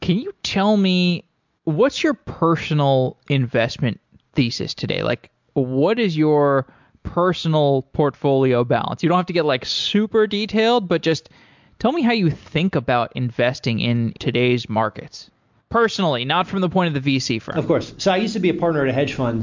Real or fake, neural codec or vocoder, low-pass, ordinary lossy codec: real; none; 7.2 kHz; MP3, 64 kbps